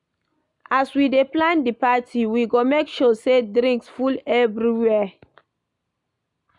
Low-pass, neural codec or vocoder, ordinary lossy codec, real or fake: 10.8 kHz; none; none; real